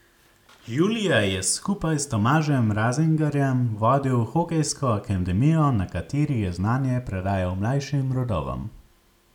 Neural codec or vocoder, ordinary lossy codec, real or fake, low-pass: none; none; real; 19.8 kHz